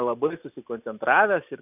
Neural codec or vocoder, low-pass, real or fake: none; 3.6 kHz; real